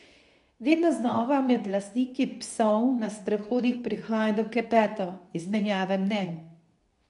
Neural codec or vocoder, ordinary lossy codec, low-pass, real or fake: codec, 24 kHz, 0.9 kbps, WavTokenizer, medium speech release version 2; none; 10.8 kHz; fake